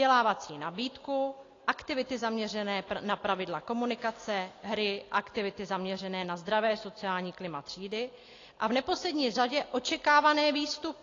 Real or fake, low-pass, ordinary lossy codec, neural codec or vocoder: real; 7.2 kHz; AAC, 32 kbps; none